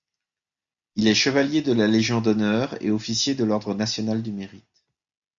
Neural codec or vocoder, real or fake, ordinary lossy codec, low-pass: none; real; MP3, 96 kbps; 7.2 kHz